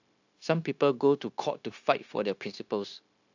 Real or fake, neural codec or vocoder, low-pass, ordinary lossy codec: fake; codec, 16 kHz, 0.9 kbps, LongCat-Audio-Codec; 7.2 kHz; AAC, 48 kbps